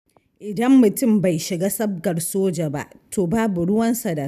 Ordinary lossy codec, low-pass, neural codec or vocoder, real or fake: none; 14.4 kHz; none; real